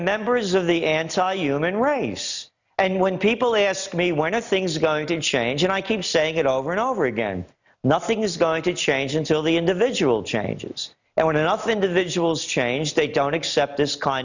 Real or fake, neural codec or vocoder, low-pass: real; none; 7.2 kHz